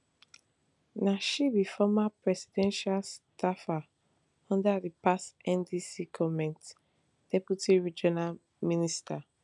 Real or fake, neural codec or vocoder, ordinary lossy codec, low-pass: real; none; none; 10.8 kHz